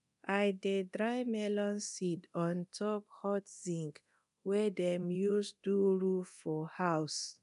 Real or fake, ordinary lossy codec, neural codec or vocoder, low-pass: fake; none; codec, 24 kHz, 0.9 kbps, DualCodec; 10.8 kHz